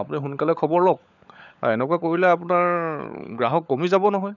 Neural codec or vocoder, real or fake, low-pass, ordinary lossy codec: codec, 16 kHz, 16 kbps, FunCodec, trained on LibriTTS, 50 frames a second; fake; 7.2 kHz; none